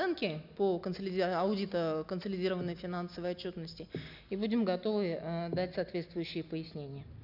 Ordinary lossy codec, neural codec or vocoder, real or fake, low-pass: none; none; real; 5.4 kHz